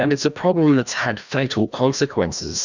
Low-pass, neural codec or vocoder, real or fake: 7.2 kHz; codec, 16 kHz, 1 kbps, FreqCodec, larger model; fake